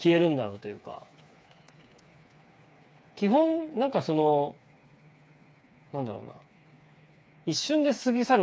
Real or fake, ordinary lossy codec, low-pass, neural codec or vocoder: fake; none; none; codec, 16 kHz, 4 kbps, FreqCodec, smaller model